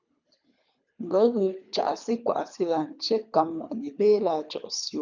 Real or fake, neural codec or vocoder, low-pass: fake; codec, 24 kHz, 3 kbps, HILCodec; 7.2 kHz